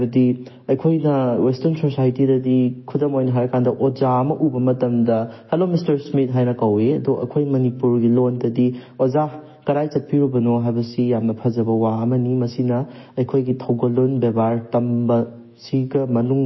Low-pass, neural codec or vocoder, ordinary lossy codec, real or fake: 7.2 kHz; autoencoder, 48 kHz, 128 numbers a frame, DAC-VAE, trained on Japanese speech; MP3, 24 kbps; fake